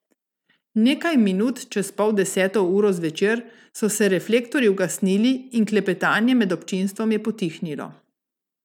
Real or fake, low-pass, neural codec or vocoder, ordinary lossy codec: real; 19.8 kHz; none; none